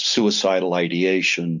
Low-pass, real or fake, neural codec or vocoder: 7.2 kHz; real; none